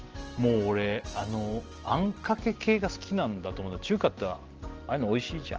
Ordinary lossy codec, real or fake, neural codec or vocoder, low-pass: Opus, 16 kbps; real; none; 7.2 kHz